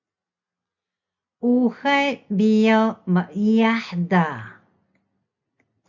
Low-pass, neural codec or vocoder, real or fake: 7.2 kHz; none; real